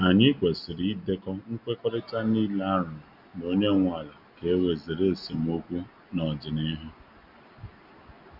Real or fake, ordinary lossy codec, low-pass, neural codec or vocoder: real; Opus, 64 kbps; 5.4 kHz; none